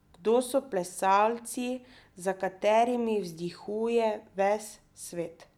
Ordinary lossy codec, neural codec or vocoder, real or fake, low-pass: none; none; real; 19.8 kHz